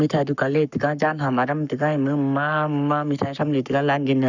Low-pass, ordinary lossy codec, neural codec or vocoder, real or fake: 7.2 kHz; none; codec, 44.1 kHz, 7.8 kbps, Pupu-Codec; fake